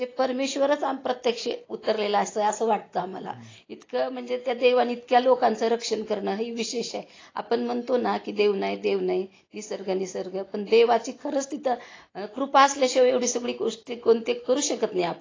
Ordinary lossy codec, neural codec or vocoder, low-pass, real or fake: AAC, 32 kbps; none; 7.2 kHz; real